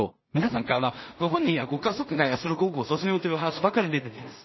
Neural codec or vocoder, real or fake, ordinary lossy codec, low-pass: codec, 16 kHz in and 24 kHz out, 0.4 kbps, LongCat-Audio-Codec, two codebook decoder; fake; MP3, 24 kbps; 7.2 kHz